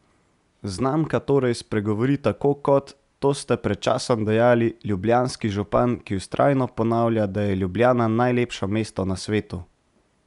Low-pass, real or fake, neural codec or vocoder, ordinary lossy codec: 10.8 kHz; real; none; none